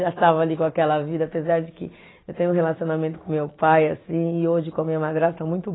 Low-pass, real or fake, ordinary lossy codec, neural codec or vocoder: 7.2 kHz; real; AAC, 16 kbps; none